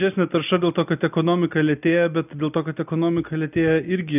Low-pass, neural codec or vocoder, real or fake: 3.6 kHz; none; real